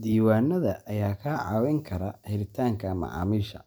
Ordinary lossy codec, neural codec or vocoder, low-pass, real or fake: none; none; none; real